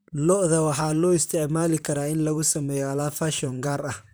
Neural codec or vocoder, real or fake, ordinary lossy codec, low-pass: vocoder, 44.1 kHz, 128 mel bands, Pupu-Vocoder; fake; none; none